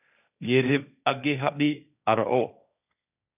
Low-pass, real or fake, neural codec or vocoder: 3.6 kHz; fake; codec, 16 kHz, 1.1 kbps, Voila-Tokenizer